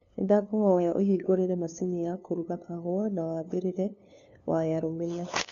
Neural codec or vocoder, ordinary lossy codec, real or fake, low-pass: codec, 16 kHz, 2 kbps, FunCodec, trained on LibriTTS, 25 frames a second; AAC, 64 kbps; fake; 7.2 kHz